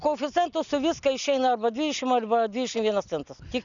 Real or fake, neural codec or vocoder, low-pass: real; none; 7.2 kHz